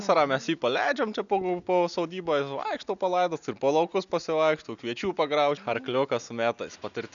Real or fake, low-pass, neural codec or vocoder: real; 7.2 kHz; none